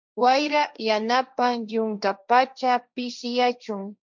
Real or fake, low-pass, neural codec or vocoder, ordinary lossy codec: fake; 7.2 kHz; codec, 16 kHz, 1.1 kbps, Voila-Tokenizer; MP3, 64 kbps